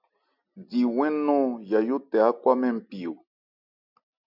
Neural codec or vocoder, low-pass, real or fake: none; 5.4 kHz; real